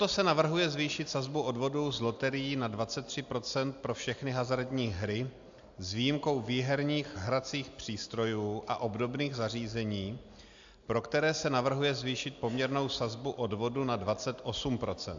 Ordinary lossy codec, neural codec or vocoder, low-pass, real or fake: AAC, 64 kbps; none; 7.2 kHz; real